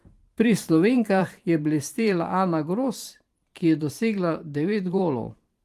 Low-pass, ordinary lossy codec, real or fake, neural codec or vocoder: 14.4 kHz; Opus, 24 kbps; fake; vocoder, 44.1 kHz, 128 mel bands every 512 samples, BigVGAN v2